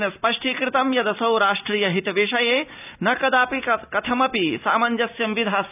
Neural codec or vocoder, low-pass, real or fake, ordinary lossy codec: none; 3.6 kHz; real; none